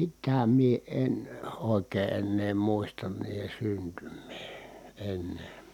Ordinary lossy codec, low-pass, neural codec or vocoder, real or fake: none; 19.8 kHz; none; real